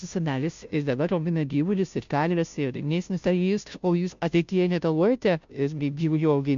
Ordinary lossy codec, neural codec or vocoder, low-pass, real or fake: MP3, 64 kbps; codec, 16 kHz, 0.5 kbps, FunCodec, trained on Chinese and English, 25 frames a second; 7.2 kHz; fake